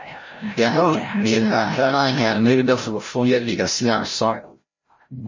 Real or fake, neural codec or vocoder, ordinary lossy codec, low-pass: fake; codec, 16 kHz, 0.5 kbps, FreqCodec, larger model; MP3, 32 kbps; 7.2 kHz